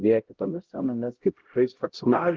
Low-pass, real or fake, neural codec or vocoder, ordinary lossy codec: 7.2 kHz; fake; codec, 16 kHz, 0.5 kbps, X-Codec, HuBERT features, trained on LibriSpeech; Opus, 16 kbps